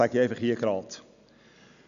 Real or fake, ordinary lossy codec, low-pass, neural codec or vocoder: real; none; 7.2 kHz; none